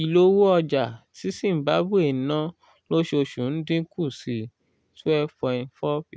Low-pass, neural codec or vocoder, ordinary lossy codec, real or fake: none; none; none; real